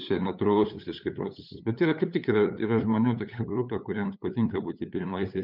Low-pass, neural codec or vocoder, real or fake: 5.4 kHz; codec, 16 kHz, 8 kbps, FunCodec, trained on LibriTTS, 25 frames a second; fake